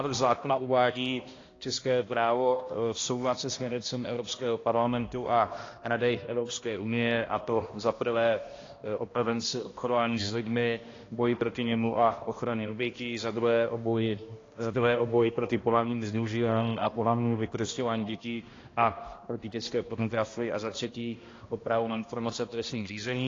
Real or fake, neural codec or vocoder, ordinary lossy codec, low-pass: fake; codec, 16 kHz, 1 kbps, X-Codec, HuBERT features, trained on balanced general audio; AAC, 32 kbps; 7.2 kHz